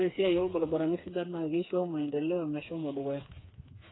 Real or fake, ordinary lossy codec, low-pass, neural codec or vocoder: fake; AAC, 16 kbps; 7.2 kHz; codec, 16 kHz, 4 kbps, FreqCodec, smaller model